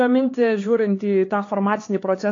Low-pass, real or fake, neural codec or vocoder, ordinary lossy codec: 7.2 kHz; fake; codec, 16 kHz, 4 kbps, X-Codec, HuBERT features, trained on LibriSpeech; AAC, 32 kbps